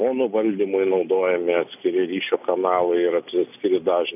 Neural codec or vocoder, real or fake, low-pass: none; real; 3.6 kHz